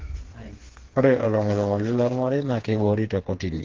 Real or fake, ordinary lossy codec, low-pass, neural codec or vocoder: fake; Opus, 16 kbps; 7.2 kHz; codec, 16 kHz, 4 kbps, FreqCodec, smaller model